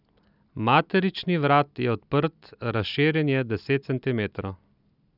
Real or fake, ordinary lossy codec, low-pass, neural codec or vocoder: real; none; 5.4 kHz; none